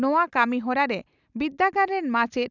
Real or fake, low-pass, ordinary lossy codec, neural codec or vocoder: real; 7.2 kHz; none; none